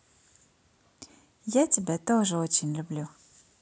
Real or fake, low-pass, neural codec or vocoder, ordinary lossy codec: real; none; none; none